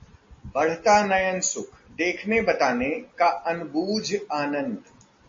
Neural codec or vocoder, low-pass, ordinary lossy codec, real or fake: none; 7.2 kHz; MP3, 32 kbps; real